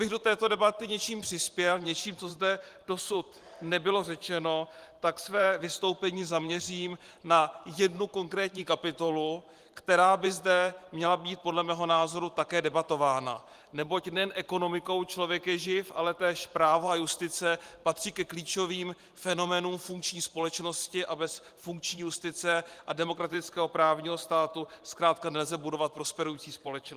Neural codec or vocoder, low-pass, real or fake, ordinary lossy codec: vocoder, 44.1 kHz, 128 mel bands every 512 samples, BigVGAN v2; 14.4 kHz; fake; Opus, 24 kbps